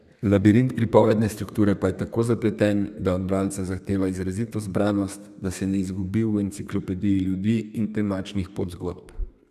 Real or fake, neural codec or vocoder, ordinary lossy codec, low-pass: fake; codec, 32 kHz, 1.9 kbps, SNAC; none; 14.4 kHz